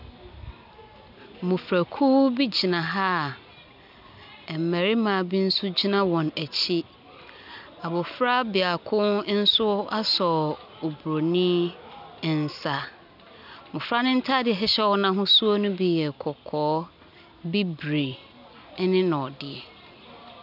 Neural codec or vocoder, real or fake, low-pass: none; real; 5.4 kHz